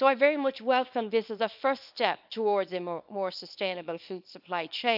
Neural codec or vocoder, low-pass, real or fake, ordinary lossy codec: codec, 24 kHz, 0.9 kbps, WavTokenizer, small release; 5.4 kHz; fake; AAC, 48 kbps